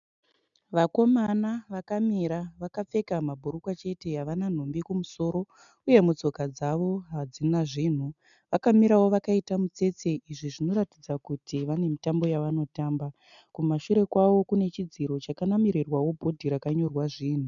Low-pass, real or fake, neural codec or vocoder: 7.2 kHz; real; none